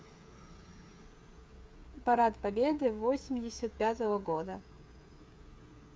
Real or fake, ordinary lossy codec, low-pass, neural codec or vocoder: fake; none; none; codec, 16 kHz, 8 kbps, FreqCodec, smaller model